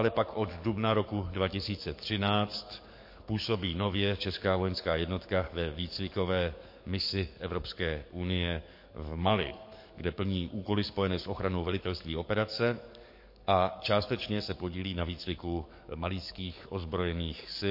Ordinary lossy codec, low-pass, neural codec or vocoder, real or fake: MP3, 32 kbps; 5.4 kHz; codec, 44.1 kHz, 7.8 kbps, DAC; fake